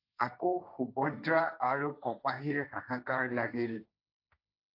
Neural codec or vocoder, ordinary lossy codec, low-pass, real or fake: codec, 16 kHz, 1.1 kbps, Voila-Tokenizer; AAC, 32 kbps; 5.4 kHz; fake